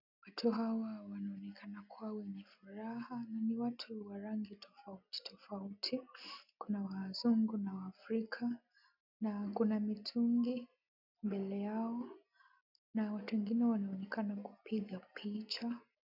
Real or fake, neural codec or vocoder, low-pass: real; none; 5.4 kHz